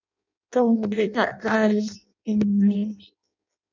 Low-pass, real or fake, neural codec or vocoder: 7.2 kHz; fake; codec, 16 kHz in and 24 kHz out, 0.6 kbps, FireRedTTS-2 codec